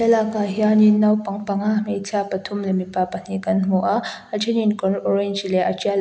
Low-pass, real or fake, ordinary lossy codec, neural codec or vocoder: none; real; none; none